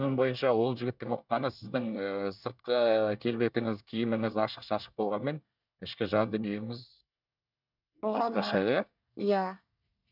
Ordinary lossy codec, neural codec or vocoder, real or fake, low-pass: none; codec, 24 kHz, 1 kbps, SNAC; fake; 5.4 kHz